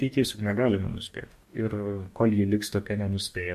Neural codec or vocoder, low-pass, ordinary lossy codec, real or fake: codec, 44.1 kHz, 2.6 kbps, DAC; 14.4 kHz; MP3, 64 kbps; fake